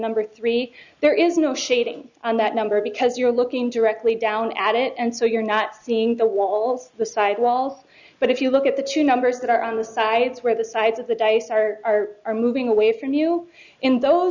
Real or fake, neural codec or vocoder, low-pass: real; none; 7.2 kHz